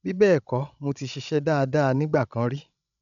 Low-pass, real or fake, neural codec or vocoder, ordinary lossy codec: 7.2 kHz; fake; codec, 16 kHz, 16 kbps, FreqCodec, larger model; none